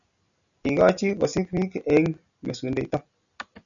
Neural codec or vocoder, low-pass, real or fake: none; 7.2 kHz; real